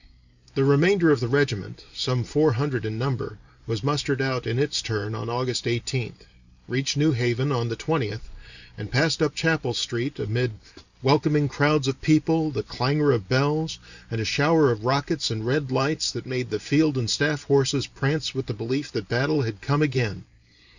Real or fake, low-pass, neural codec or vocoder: fake; 7.2 kHz; vocoder, 44.1 kHz, 128 mel bands every 512 samples, BigVGAN v2